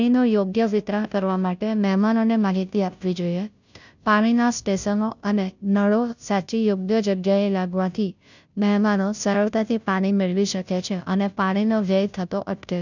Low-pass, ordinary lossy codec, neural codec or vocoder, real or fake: 7.2 kHz; none; codec, 16 kHz, 0.5 kbps, FunCodec, trained on Chinese and English, 25 frames a second; fake